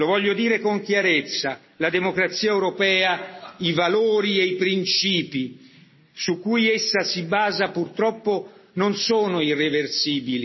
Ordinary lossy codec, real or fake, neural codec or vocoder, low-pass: MP3, 24 kbps; real; none; 7.2 kHz